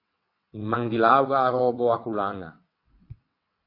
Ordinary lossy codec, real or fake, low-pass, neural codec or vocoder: AAC, 32 kbps; fake; 5.4 kHz; vocoder, 22.05 kHz, 80 mel bands, WaveNeXt